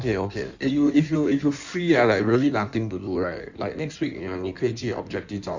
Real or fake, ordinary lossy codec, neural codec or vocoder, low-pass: fake; Opus, 64 kbps; codec, 16 kHz in and 24 kHz out, 1.1 kbps, FireRedTTS-2 codec; 7.2 kHz